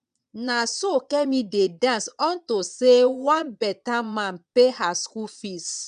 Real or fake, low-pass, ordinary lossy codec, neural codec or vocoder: fake; 9.9 kHz; none; vocoder, 22.05 kHz, 80 mel bands, Vocos